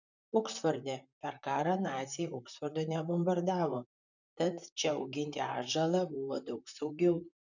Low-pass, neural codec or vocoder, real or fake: 7.2 kHz; vocoder, 44.1 kHz, 128 mel bands, Pupu-Vocoder; fake